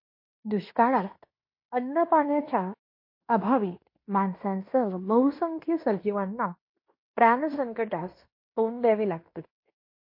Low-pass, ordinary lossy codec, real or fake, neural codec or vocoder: 5.4 kHz; MP3, 32 kbps; fake; codec, 16 kHz in and 24 kHz out, 0.9 kbps, LongCat-Audio-Codec, fine tuned four codebook decoder